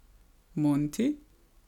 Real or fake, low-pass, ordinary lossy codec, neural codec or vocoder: real; 19.8 kHz; none; none